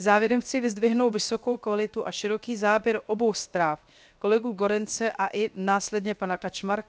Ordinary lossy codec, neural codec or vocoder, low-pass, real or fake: none; codec, 16 kHz, 0.7 kbps, FocalCodec; none; fake